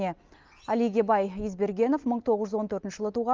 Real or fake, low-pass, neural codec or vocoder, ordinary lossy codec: real; 7.2 kHz; none; Opus, 24 kbps